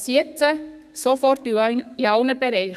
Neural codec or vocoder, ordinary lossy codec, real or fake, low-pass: codec, 32 kHz, 1.9 kbps, SNAC; none; fake; 14.4 kHz